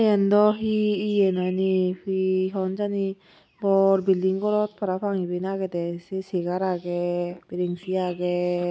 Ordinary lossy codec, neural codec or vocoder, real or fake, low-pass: none; none; real; none